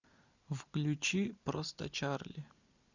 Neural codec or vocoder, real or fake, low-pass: none; real; 7.2 kHz